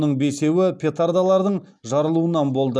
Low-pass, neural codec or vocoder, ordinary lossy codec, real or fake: none; none; none; real